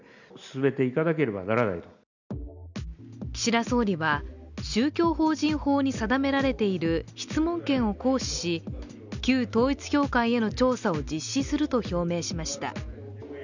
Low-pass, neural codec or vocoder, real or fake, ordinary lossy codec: 7.2 kHz; none; real; none